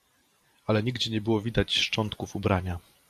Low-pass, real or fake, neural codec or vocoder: 14.4 kHz; fake; vocoder, 44.1 kHz, 128 mel bands every 512 samples, BigVGAN v2